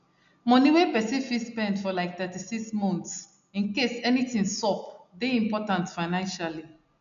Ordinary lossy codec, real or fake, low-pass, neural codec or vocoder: MP3, 96 kbps; real; 7.2 kHz; none